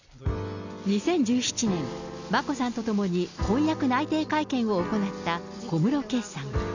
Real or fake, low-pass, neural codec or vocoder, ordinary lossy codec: real; 7.2 kHz; none; none